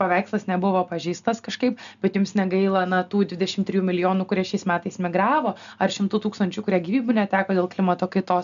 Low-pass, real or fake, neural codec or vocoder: 7.2 kHz; real; none